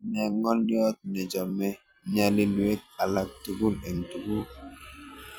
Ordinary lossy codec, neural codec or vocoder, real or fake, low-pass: none; vocoder, 44.1 kHz, 128 mel bands every 256 samples, BigVGAN v2; fake; none